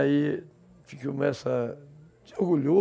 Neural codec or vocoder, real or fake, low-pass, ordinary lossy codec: none; real; none; none